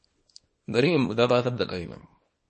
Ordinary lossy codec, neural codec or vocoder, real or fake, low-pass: MP3, 32 kbps; codec, 24 kHz, 0.9 kbps, WavTokenizer, small release; fake; 10.8 kHz